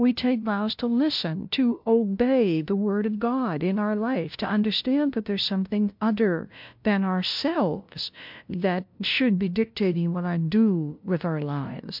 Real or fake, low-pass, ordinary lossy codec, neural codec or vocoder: fake; 5.4 kHz; MP3, 48 kbps; codec, 16 kHz, 0.5 kbps, FunCodec, trained on LibriTTS, 25 frames a second